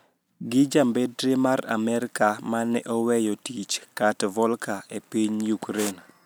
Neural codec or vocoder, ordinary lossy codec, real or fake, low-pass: none; none; real; none